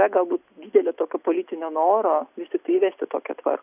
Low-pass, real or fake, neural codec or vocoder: 3.6 kHz; real; none